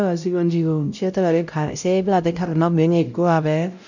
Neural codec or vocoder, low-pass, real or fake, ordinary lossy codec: codec, 16 kHz, 0.5 kbps, X-Codec, WavLM features, trained on Multilingual LibriSpeech; 7.2 kHz; fake; none